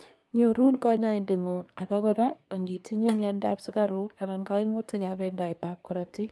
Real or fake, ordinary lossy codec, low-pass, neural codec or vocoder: fake; none; none; codec, 24 kHz, 1 kbps, SNAC